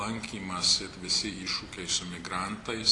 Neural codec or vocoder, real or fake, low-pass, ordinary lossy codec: none; real; 10.8 kHz; AAC, 48 kbps